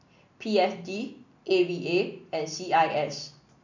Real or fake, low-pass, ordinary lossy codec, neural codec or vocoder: real; 7.2 kHz; none; none